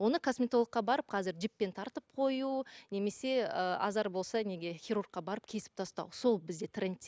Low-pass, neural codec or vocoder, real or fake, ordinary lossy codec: none; none; real; none